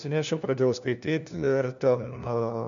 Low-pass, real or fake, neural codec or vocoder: 7.2 kHz; fake; codec, 16 kHz, 1 kbps, FunCodec, trained on LibriTTS, 50 frames a second